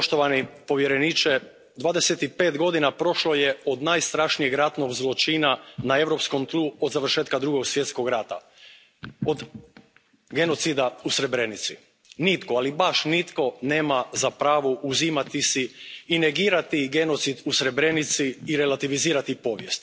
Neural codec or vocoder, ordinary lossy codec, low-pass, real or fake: none; none; none; real